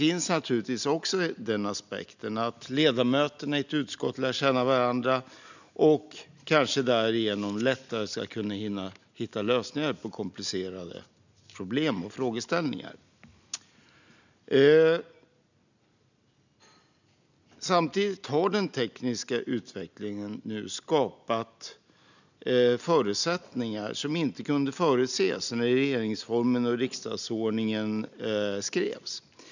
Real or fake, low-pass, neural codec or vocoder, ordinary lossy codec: real; 7.2 kHz; none; none